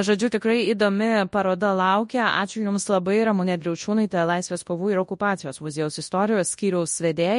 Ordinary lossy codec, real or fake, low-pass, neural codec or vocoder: MP3, 48 kbps; fake; 10.8 kHz; codec, 24 kHz, 0.9 kbps, WavTokenizer, large speech release